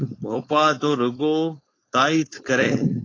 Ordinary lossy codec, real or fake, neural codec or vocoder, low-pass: AAC, 32 kbps; fake; codec, 16 kHz, 4.8 kbps, FACodec; 7.2 kHz